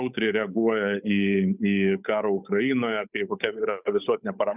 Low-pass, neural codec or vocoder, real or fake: 3.6 kHz; codec, 16 kHz, 8 kbps, FunCodec, trained on Chinese and English, 25 frames a second; fake